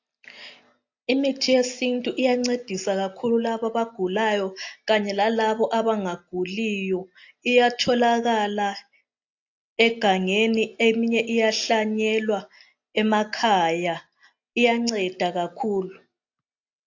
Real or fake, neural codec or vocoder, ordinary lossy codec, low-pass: real; none; AAC, 48 kbps; 7.2 kHz